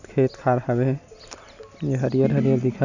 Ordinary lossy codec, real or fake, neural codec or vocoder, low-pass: none; real; none; 7.2 kHz